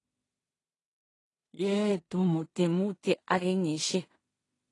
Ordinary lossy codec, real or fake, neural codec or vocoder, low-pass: AAC, 32 kbps; fake; codec, 16 kHz in and 24 kHz out, 0.4 kbps, LongCat-Audio-Codec, two codebook decoder; 10.8 kHz